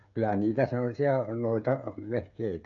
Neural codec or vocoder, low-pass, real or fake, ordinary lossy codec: codec, 16 kHz, 8 kbps, FreqCodec, smaller model; 7.2 kHz; fake; none